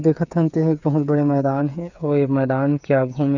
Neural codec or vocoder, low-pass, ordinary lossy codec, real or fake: codec, 16 kHz, 8 kbps, FreqCodec, smaller model; 7.2 kHz; none; fake